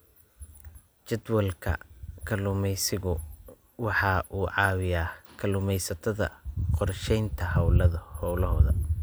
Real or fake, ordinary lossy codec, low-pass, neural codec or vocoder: real; none; none; none